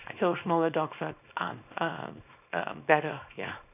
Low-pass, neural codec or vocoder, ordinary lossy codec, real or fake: 3.6 kHz; codec, 24 kHz, 0.9 kbps, WavTokenizer, small release; none; fake